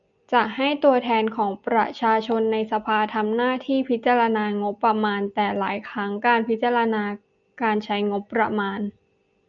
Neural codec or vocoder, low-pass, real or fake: none; 7.2 kHz; real